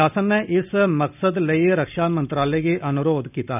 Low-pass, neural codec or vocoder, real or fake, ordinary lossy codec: 3.6 kHz; none; real; none